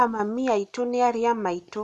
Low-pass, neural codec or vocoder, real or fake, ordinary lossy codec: none; none; real; none